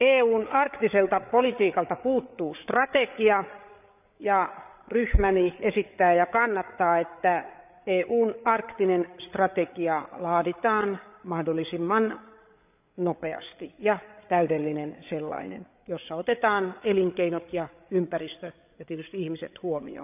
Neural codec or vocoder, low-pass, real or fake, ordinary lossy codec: codec, 16 kHz, 8 kbps, FreqCodec, larger model; 3.6 kHz; fake; none